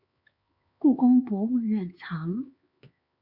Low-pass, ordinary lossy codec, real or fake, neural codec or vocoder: 5.4 kHz; Opus, 64 kbps; fake; codec, 16 kHz, 4 kbps, X-Codec, HuBERT features, trained on LibriSpeech